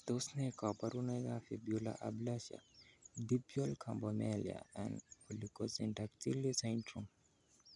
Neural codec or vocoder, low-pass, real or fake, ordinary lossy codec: none; 10.8 kHz; real; none